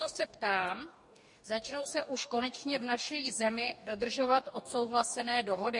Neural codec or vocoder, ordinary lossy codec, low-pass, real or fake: codec, 44.1 kHz, 2.6 kbps, DAC; MP3, 48 kbps; 10.8 kHz; fake